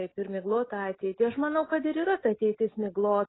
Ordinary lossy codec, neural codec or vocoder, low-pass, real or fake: AAC, 16 kbps; none; 7.2 kHz; real